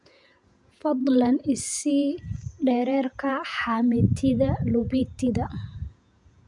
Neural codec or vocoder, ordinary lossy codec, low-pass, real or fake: vocoder, 48 kHz, 128 mel bands, Vocos; none; 10.8 kHz; fake